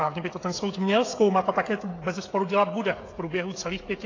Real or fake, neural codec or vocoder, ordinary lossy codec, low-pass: fake; codec, 16 kHz, 16 kbps, FreqCodec, smaller model; AAC, 32 kbps; 7.2 kHz